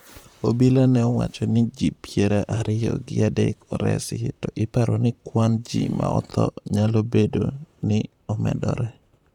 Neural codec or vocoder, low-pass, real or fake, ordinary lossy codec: vocoder, 44.1 kHz, 128 mel bands, Pupu-Vocoder; 19.8 kHz; fake; none